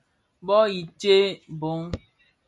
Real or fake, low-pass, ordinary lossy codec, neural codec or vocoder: real; 10.8 kHz; MP3, 64 kbps; none